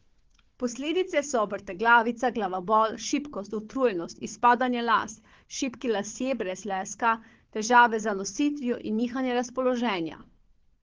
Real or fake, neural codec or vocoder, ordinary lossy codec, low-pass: fake; codec, 16 kHz, 4 kbps, FunCodec, trained on Chinese and English, 50 frames a second; Opus, 16 kbps; 7.2 kHz